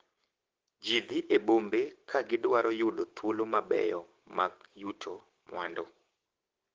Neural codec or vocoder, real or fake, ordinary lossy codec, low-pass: codec, 16 kHz, 6 kbps, DAC; fake; Opus, 16 kbps; 7.2 kHz